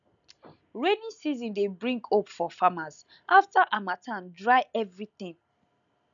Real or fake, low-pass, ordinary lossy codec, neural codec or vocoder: real; 7.2 kHz; none; none